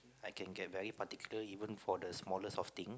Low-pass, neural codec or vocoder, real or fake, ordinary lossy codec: none; none; real; none